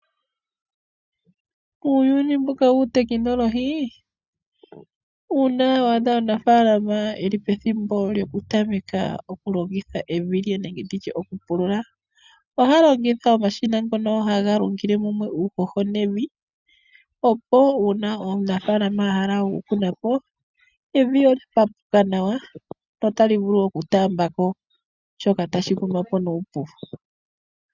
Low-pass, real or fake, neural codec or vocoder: 7.2 kHz; real; none